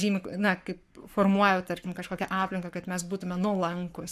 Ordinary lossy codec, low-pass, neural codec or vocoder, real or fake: AAC, 96 kbps; 14.4 kHz; codec, 44.1 kHz, 7.8 kbps, Pupu-Codec; fake